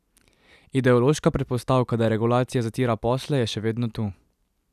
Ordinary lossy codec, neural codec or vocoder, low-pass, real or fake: none; none; 14.4 kHz; real